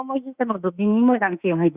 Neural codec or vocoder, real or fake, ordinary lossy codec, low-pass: autoencoder, 48 kHz, 32 numbers a frame, DAC-VAE, trained on Japanese speech; fake; Opus, 64 kbps; 3.6 kHz